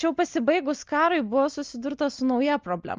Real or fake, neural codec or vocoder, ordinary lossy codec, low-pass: real; none; Opus, 24 kbps; 7.2 kHz